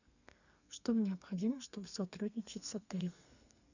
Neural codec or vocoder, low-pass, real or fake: codec, 32 kHz, 1.9 kbps, SNAC; 7.2 kHz; fake